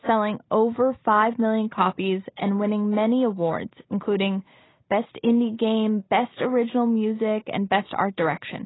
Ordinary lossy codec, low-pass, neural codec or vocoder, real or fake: AAC, 16 kbps; 7.2 kHz; none; real